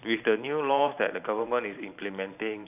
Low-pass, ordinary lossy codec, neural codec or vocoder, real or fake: 3.6 kHz; none; vocoder, 44.1 kHz, 128 mel bands every 512 samples, BigVGAN v2; fake